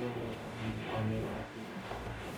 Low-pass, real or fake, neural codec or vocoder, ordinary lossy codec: 19.8 kHz; fake; codec, 44.1 kHz, 0.9 kbps, DAC; none